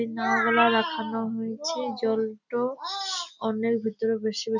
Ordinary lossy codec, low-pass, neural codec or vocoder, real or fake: none; 7.2 kHz; none; real